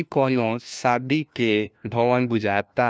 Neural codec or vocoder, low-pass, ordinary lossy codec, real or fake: codec, 16 kHz, 1 kbps, FunCodec, trained on LibriTTS, 50 frames a second; none; none; fake